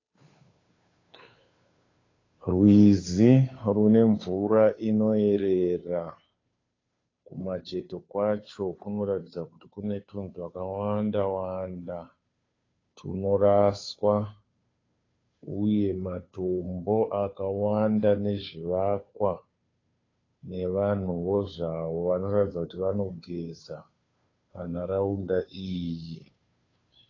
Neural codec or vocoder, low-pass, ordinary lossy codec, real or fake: codec, 16 kHz, 2 kbps, FunCodec, trained on Chinese and English, 25 frames a second; 7.2 kHz; AAC, 32 kbps; fake